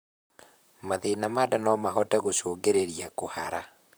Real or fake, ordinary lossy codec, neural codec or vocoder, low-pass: fake; none; vocoder, 44.1 kHz, 128 mel bands, Pupu-Vocoder; none